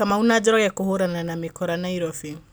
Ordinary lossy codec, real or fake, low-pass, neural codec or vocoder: none; real; none; none